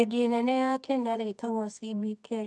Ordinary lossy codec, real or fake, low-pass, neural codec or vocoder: none; fake; none; codec, 24 kHz, 0.9 kbps, WavTokenizer, medium music audio release